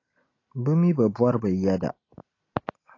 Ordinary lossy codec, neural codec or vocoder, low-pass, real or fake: AAC, 32 kbps; none; 7.2 kHz; real